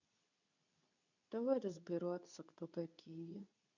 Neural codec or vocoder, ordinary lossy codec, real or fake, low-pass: codec, 24 kHz, 0.9 kbps, WavTokenizer, medium speech release version 2; none; fake; 7.2 kHz